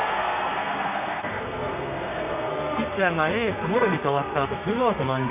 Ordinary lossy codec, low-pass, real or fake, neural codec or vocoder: none; 3.6 kHz; fake; codec, 32 kHz, 1.9 kbps, SNAC